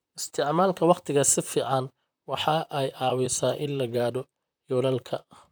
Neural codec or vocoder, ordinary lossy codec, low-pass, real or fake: vocoder, 44.1 kHz, 128 mel bands, Pupu-Vocoder; none; none; fake